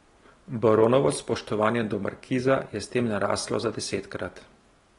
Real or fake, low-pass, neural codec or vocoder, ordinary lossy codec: real; 10.8 kHz; none; AAC, 32 kbps